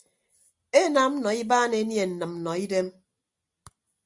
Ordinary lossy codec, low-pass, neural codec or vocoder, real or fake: Opus, 64 kbps; 10.8 kHz; none; real